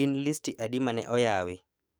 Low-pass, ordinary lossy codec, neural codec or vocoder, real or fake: none; none; codec, 44.1 kHz, 7.8 kbps, DAC; fake